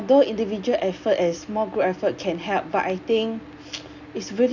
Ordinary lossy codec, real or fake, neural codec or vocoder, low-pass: none; real; none; 7.2 kHz